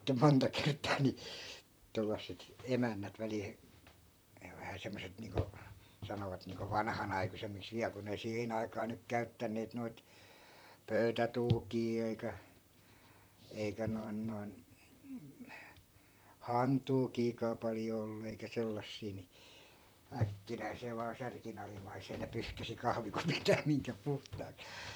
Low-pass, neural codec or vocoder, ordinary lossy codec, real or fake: none; vocoder, 44.1 kHz, 128 mel bands, Pupu-Vocoder; none; fake